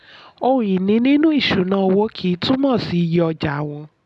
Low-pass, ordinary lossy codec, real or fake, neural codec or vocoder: none; none; real; none